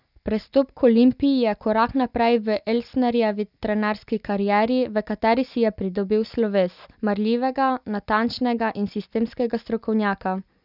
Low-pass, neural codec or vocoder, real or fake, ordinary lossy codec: 5.4 kHz; none; real; none